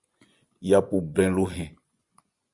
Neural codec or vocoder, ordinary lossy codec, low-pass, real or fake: vocoder, 24 kHz, 100 mel bands, Vocos; Opus, 64 kbps; 10.8 kHz; fake